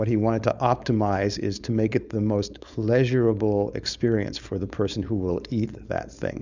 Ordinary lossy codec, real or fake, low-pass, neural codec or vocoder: Opus, 64 kbps; fake; 7.2 kHz; codec, 16 kHz, 4.8 kbps, FACodec